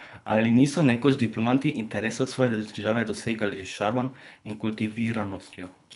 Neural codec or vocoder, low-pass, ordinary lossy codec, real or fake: codec, 24 kHz, 3 kbps, HILCodec; 10.8 kHz; none; fake